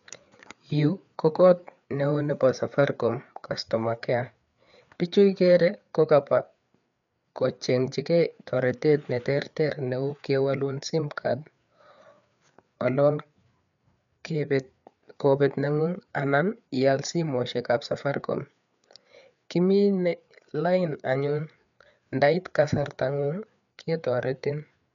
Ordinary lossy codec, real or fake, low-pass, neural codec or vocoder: none; fake; 7.2 kHz; codec, 16 kHz, 4 kbps, FreqCodec, larger model